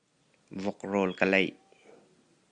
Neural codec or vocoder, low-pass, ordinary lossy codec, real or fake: none; 9.9 kHz; Opus, 64 kbps; real